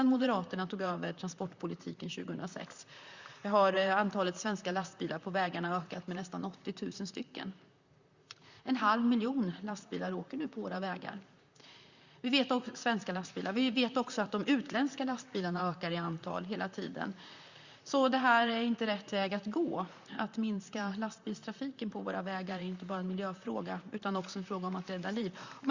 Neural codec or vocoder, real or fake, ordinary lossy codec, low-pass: vocoder, 44.1 kHz, 128 mel bands, Pupu-Vocoder; fake; Opus, 64 kbps; 7.2 kHz